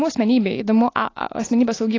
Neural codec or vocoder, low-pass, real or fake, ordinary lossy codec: none; 7.2 kHz; real; AAC, 32 kbps